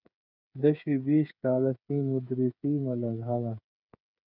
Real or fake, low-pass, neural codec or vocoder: fake; 5.4 kHz; codec, 16 kHz, 8 kbps, FreqCodec, smaller model